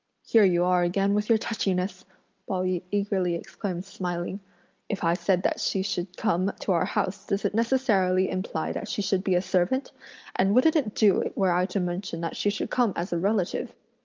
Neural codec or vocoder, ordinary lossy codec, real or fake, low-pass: none; Opus, 24 kbps; real; 7.2 kHz